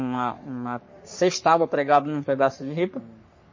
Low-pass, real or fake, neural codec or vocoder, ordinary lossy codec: 7.2 kHz; fake; codec, 44.1 kHz, 3.4 kbps, Pupu-Codec; MP3, 32 kbps